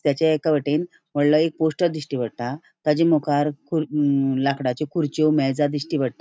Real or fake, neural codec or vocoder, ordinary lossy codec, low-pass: real; none; none; none